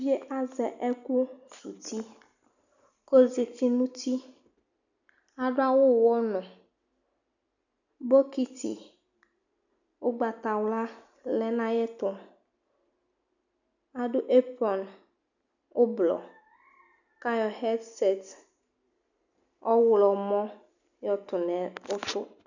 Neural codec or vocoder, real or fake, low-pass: none; real; 7.2 kHz